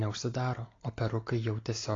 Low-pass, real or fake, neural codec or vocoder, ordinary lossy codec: 7.2 kHz; real; none; AAC, 32 kbps